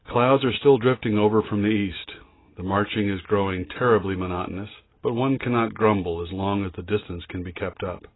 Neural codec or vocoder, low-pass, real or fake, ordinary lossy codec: none; 7.2 kHz; real; AAC, 16 kbps